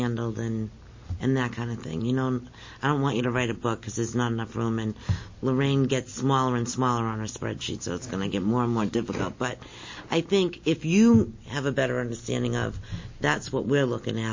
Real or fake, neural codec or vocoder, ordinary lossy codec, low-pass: real; none; MP3, 32 kbps; 7.2 kHz